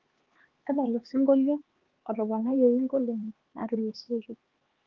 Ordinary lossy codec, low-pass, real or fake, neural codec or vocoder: Opus, 16 kbps; 7.2 kHz; fake; codec, 16 kHz, 4 kbps, X-Codec, HuBERT features, trained on LibriSpeech